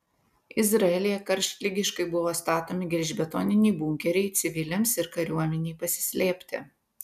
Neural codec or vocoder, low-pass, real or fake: none; 14.4 kHz; real